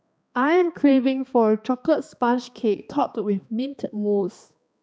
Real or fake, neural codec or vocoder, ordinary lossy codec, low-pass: fake; codec, 16 kHz, 2 kbps, X-Codec, HuBERT features, trained on balanced general audio; none; none